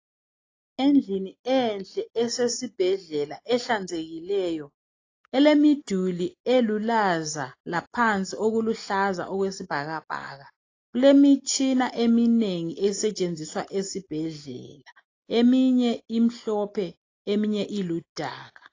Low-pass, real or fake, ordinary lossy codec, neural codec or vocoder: 7.2 kHz; real; AAC, 32 kbps; none